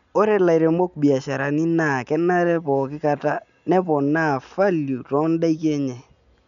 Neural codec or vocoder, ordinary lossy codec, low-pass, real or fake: none; none; 7.2 kHz; real